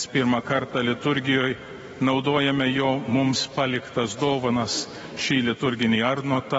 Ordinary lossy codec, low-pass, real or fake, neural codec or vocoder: AAC, 24 kbps; 19.8 kHz; fake; autoencoder, 48 kHz, 128 numbers a frame, DAC-VAE, trained on Japanese speech